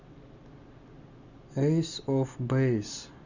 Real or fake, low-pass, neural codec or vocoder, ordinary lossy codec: real; 7.2 kHz; none; Opus, 64 kbps